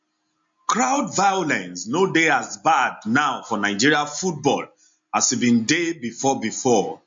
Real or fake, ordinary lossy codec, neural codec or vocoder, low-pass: real; MP3, 48 kbps; none; 7.2 kHz